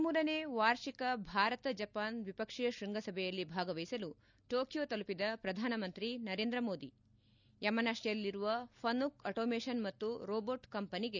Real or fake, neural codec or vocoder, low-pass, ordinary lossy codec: real; none; 7.2 kHz; none